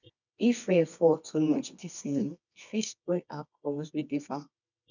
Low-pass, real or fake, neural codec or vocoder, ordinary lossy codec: 7.2 kHz; fake; codec, 24 kHz, 0.9 kbps, WavTokenizer, medium music audio release; none